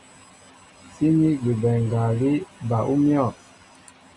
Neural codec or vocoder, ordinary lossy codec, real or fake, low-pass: none; Opus, 64 kbps; real; 10.8 kHz